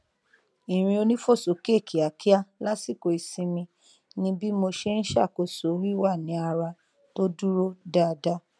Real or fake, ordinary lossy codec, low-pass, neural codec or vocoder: real; none; none; none